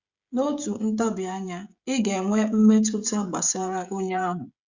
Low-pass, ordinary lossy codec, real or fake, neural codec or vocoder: 7.2 kHz; Opus, 64 kbps; fake; codec, 16 kHz, 16 kbps, FreqCodec, smaller model